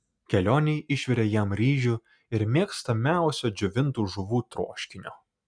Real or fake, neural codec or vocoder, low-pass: real; none; 9.9 kHz